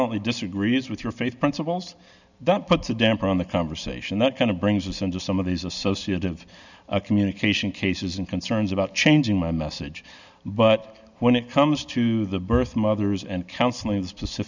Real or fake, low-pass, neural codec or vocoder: real; 7.2 kHz; none